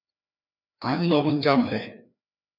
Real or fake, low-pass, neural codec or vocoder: fake; 5.4 kHz; codec, 16 kHz, 2 kbps, FreqCodec, larger model